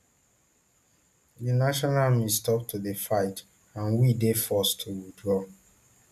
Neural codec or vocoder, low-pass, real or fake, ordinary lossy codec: none; 14.4 kHz; real; none